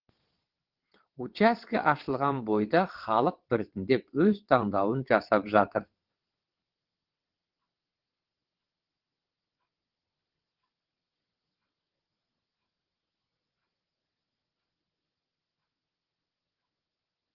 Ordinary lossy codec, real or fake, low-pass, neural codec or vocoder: Opus, 16 kbps; fake; 5.4 kHz; vocoder, 22.05 kHz, 80 mel bands, WaveNeXt